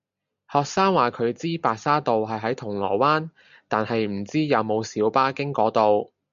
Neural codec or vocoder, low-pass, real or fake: none; 7.2 kHz; real